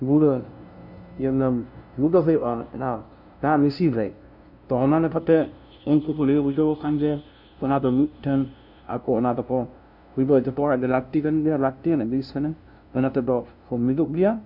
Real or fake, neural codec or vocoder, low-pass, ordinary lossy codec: fake; codec, 16 kHz, 0.5 kbps, FunCodec, trained on LibriTTS, 25 frames a second; 5.4 kHz; none